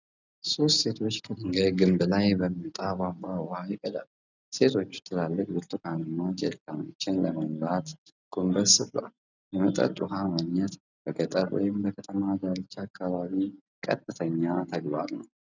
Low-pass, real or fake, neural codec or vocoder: 7.2 kHz; real; none